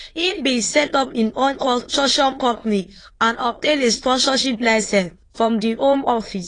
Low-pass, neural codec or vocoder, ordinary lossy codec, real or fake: 9.9 kHz; autoencoder, 22.05 kHz, a latent of 192 numbers a frame, VITS, trained on many speakers; AAC, 32 kbps; fake